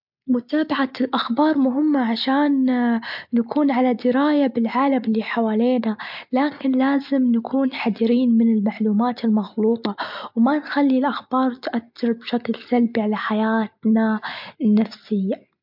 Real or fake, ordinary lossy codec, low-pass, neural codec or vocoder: real; MP3, 48 kbps; 5.4 kHz; none